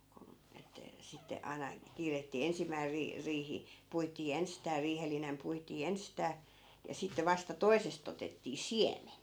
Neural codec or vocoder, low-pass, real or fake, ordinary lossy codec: none; none; real; none